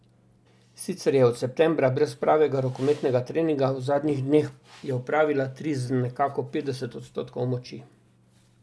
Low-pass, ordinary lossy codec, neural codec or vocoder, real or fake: none; none; none; real